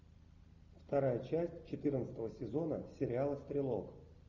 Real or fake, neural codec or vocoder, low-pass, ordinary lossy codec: real; none; 7.2 kHz; Opus, 64 kbps